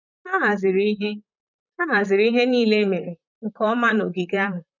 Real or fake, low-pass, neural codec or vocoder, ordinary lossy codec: fake; 7.2 kHz; vocoder, 44.1 kHz, 128 mel bands, Pupu-Vocoder; none